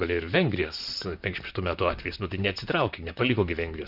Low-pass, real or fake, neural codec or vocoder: 5.4 kHz; fake; vocoder, 44.1 kHz, 128 mel bands, Pupu-Vocoder